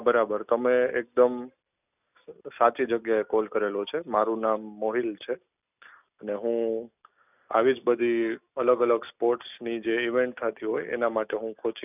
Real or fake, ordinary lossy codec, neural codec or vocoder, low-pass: real; none; none; 3.6 kHz